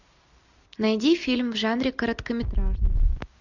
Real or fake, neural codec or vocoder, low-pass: real; none; 7.2 kHz